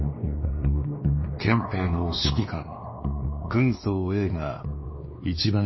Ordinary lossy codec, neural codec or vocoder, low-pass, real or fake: MP3, 24 kbps; codec, 16 kHz, 2 kbps, X-Codec, WavLM features, trained on Multilingual LibriSpeech; 7.2 kHz; fake